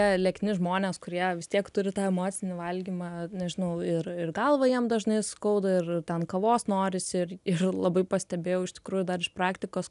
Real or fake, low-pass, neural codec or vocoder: real; 10.8 kHz; none